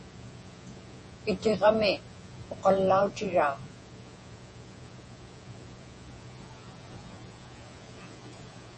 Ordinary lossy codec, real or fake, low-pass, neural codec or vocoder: MP3, 32 kbps; fake; 10.8 kHz; vocoder, 48 kHz, 128 mel bands, Vocos